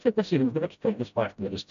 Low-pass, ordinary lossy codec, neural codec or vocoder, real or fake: 7.2 kHz; AAC, 64 kbps; codec, 16 kHz, 0.5 kbps, FreqCodec, smaller model; fake